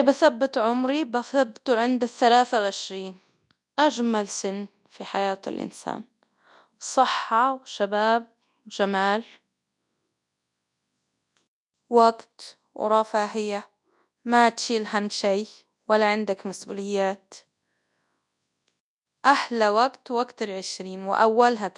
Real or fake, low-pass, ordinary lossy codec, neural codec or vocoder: fake; 10.8 kHz; none; codec, 24 kHz, 0.9 kbps, WavTokenizer, large speech release